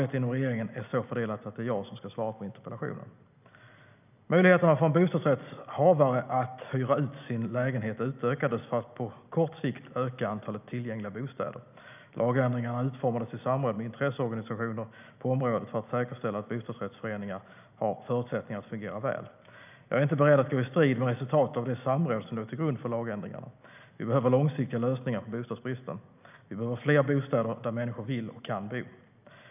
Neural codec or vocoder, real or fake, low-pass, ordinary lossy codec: none; real; 3.6 kHz; none